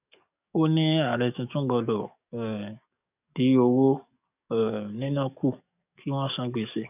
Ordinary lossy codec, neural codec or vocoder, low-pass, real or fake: none; codec, 44.1 kHz, 7.8 kbps, DAC; 3.6 kHz; fake